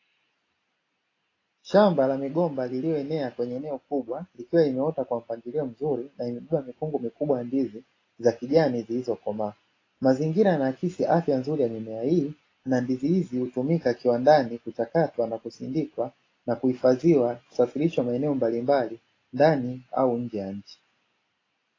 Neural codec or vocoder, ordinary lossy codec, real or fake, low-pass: none; AAC, 32 kbps; real; 7.2 kHz